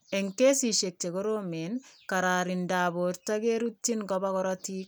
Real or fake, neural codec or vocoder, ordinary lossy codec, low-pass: real; none; none; none